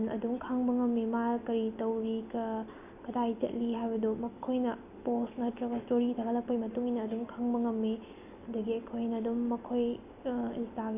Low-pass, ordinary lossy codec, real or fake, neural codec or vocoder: 3.6 kHz; none; real; none